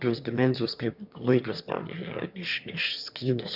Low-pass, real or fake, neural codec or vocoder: 5.4 kHz; fake; autoencoder, 22.05 kHz, a latent of 192 numbers a frame, VITS, trained on one speaker